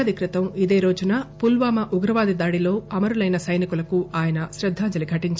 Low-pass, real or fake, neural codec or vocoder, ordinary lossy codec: none; real; none; none